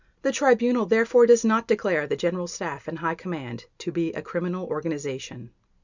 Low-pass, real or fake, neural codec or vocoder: 7.2 kHz; real; none